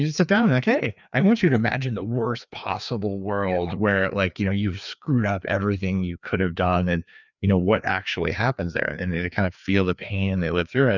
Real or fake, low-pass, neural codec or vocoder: fake; 7.2 kHz; codec, 16 kHz, 2 kbps, FreqCodec, larger model